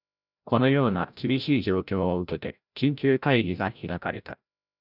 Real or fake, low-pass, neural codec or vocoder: fake; 5.4 kHz; codec, 16 kHz, 0.5 kbps, FreqCodec, larger model